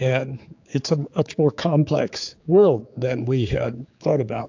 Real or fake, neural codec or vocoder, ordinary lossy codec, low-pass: fake; codec, 16 kHz, 4 kbps, X-Codec, HuBERT features, trained on general audio; AAC, 48 kbps; 7.2 kHz